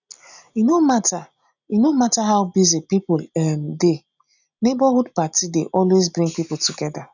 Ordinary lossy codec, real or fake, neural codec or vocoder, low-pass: none; real; none; 7.2 kHz